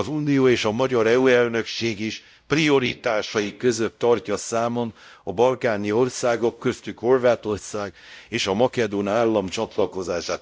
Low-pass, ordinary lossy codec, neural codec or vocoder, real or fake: none; none; codec, 16 kHz, 0.5 kbps, X-Codec, WavLM features, trained on Multilingual LibriSpeech; fake